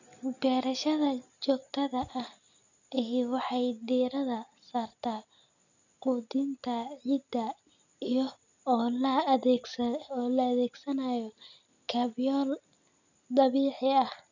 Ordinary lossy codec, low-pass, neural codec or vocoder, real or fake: none; 7.2 kHz; none; real